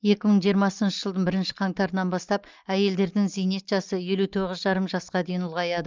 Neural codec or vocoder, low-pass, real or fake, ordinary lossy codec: none; 7.2 kHz; real; Opus, 32 kbps